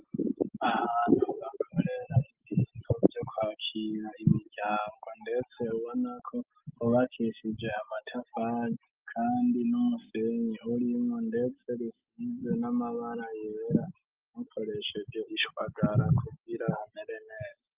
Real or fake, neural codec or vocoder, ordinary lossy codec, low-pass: real; none; Opus, 24 kbps; 3.6 kHz